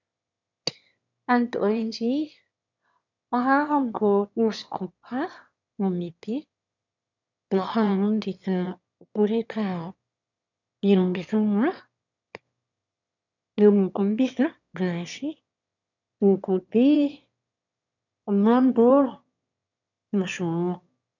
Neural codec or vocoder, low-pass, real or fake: autoencoder, 22.05 kHz, a latent of 192 numbers a frame, VITS, trained on one speaker; 7.2 kHz; fake